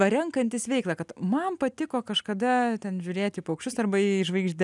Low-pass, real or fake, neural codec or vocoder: 10.8 kHz; real; none